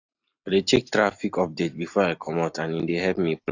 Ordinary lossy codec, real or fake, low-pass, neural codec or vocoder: AAC, 48 kbps; real; 7.2 kHz; none